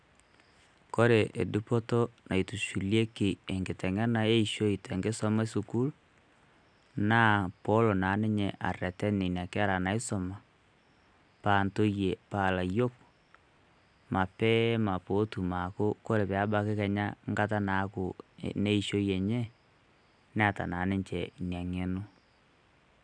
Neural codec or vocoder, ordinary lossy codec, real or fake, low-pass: none; none; real; 9.9 kHz